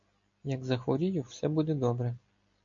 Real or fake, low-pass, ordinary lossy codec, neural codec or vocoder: real; 7.2 kHz; AAC, 48 kbps; none